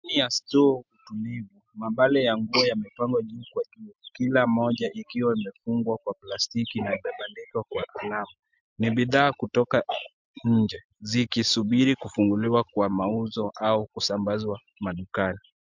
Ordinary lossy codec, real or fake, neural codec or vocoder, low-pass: MP3, 64 kbps; real; none; 7.2 kHz